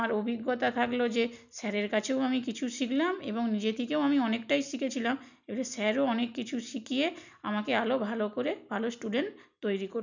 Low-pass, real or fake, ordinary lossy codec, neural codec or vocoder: 7.2 kHz; real; none; none